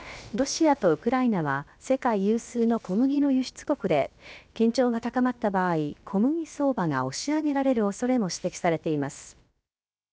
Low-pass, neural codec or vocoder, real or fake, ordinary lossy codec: none; codec, 16 kHz, about 1 kbps, DyCAST, with the encoder's durations; fake; none